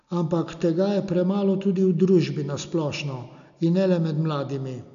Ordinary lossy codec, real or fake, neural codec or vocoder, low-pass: none; real; none; 7.2 kHz